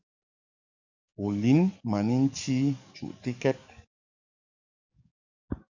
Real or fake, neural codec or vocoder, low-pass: fake; codec, 44.1 kHz, 7.8 kbps, DAC; 7.2 kHz